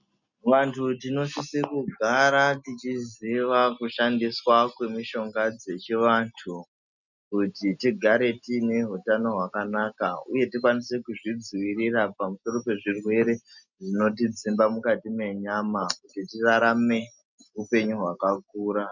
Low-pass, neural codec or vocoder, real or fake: 7.2 kHz; none; real